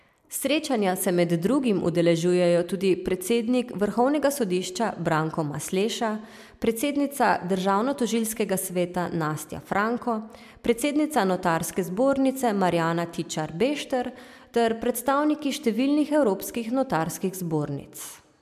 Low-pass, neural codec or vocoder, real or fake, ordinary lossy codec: 14.4 kHz; none; real; MP3, 96 kbps